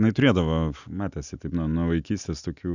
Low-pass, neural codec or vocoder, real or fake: 7.2 kHz; none; real